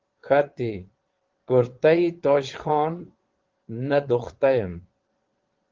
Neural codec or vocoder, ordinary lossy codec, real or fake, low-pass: vocoder, 22.05 kHz, 80 mel bands, Vocos; Opus, 16 kbps; fake; 7.2 kHz